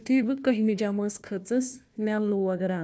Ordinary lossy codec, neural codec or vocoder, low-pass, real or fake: none; codec, 16 kHz, 1 kbps, FunCodec, trained on Chinese and English, 50 frames a second; none; fake